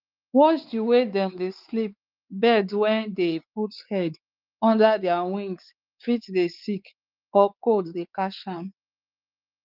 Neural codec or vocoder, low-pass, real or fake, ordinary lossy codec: codec, 16 kHz, 4 kbps, X-Codec, WavLM features, trained on Multilingual LibriSpeech; 5.4 kHz; fake; Opus, 32 kbps